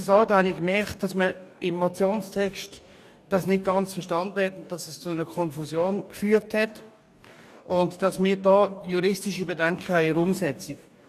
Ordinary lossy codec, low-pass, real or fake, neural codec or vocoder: none; 14.4 kHz; fake; codec, 44.1 kHz, 2.6 kbps, DAC